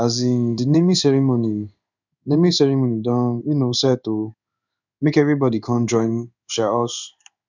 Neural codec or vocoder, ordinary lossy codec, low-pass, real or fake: codec, 16 kHz in and 24 kHz out, 1 kbps, XY-Tokenizer; none; 7.2 kHz; fake